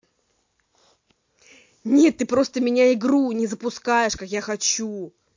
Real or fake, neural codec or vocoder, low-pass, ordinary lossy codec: real; none; 7.2 kHz; MP3, 48 kbps